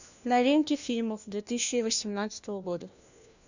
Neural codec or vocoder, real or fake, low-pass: codec, 16 kHz, 1 kbps, FunCodec, trained on LibriTTS, 50 frames a second; fake; 7.2 kHz